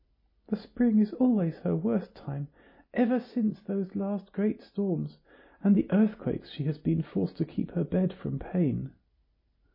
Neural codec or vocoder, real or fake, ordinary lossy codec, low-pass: none; real; MP3, 24 kbps; 5.4 kHz